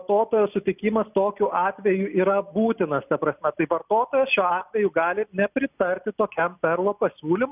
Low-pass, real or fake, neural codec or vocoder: 3.6 kHz; real; none